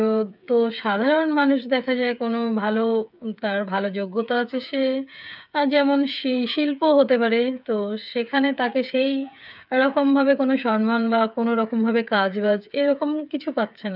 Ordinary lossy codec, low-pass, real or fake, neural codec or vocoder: none; 5.4 kHz; fake; codec, 16 kHz, 8 kbps, FreqCodec, smaller model